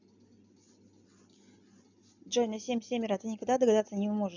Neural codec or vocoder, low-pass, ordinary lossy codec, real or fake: none; 7.2 kHz; none; real